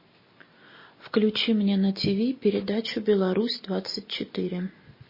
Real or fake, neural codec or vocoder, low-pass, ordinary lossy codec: real; none; 5.4 kHz; MP3, 24 kbps